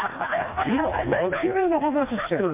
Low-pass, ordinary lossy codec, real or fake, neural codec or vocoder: 3.6 kHz; MP3, 32 kbps; fake; codec, 16 kHz, 2 kbps, FreqCodec, smaller model